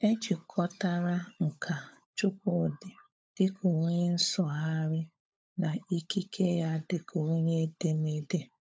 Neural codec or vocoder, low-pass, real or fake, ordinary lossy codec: codec, 16 kHz, 16 kbps, FunCodec, trained on LibriTTS, 50 frames a second; none; fake; none